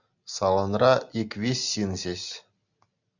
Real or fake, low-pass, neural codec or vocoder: real; 7.2 kHz; none